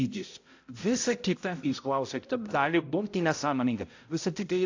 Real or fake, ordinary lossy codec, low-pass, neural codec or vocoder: fake; AAC, 48 kbps; 7.2 kHz; codec, 16 kHz, 0.5 kbps, X-Codec, HuBERT features, trained on balanced general audio